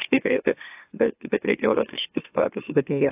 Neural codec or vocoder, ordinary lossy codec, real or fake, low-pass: autoencoder, 44.1 kHz, a latent of 192 numbers a frame, MeloTTS; AAC, 24 kbps; fake; 3.6 kHz